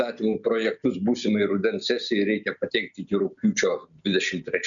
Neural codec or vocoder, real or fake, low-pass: none; real; 7.2 kHz